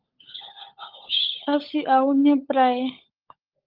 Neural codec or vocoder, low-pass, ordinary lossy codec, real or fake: codec, 16 kHz, 16 kbps, FunCodec, trained on LibriTTS, 50 frames a second; 5.4 kHz; Opus, 32 kbps; fake